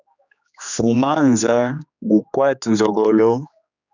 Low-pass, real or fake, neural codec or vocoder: 7.2 kHz; fake; codec, 16 kHz, 2 kbps, X-Codec, HuBERT features, trained on general audio